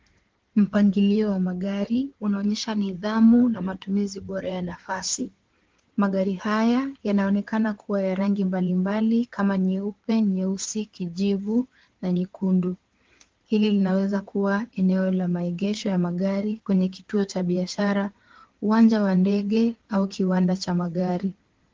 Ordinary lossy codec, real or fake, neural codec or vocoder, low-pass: Opus, 16 kbps; fake; codec, 16 kHz in and 24 kHz out, 2.2 kbps, FireRedTTS-2 codec; 7.2 kHz